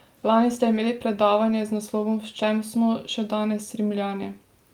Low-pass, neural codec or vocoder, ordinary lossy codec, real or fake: 19.8 kHz; none; Opus, 32 kbps; real